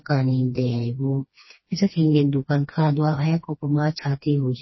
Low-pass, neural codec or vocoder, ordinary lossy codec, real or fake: 7.2 kHz; codec, 16 kHz, 2 kbps, FreqCodec, smaller model; MP3, 24 kbps; fake